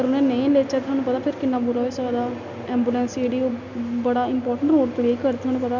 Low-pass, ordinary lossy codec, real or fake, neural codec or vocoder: 7.2 kHz; none; real; none